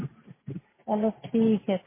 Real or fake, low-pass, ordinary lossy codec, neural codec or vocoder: real; 3.6 kHz; MP3, 16 kbps; none